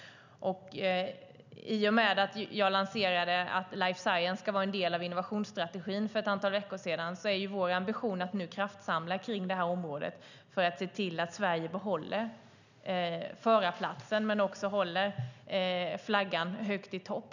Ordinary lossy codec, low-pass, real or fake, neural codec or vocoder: none; 7.2 kHz; real; none